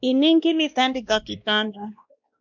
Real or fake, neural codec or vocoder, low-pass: fake; codec, 16 kHz, 1 kbps, X-Codec, WavLM features, trained on Multilingual LibriSpeech; 7.2 kHz